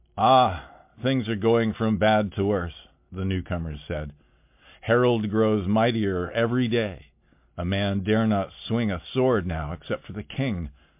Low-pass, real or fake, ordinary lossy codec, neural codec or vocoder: 3.6 kHz; real; MP3, 32 kbps; none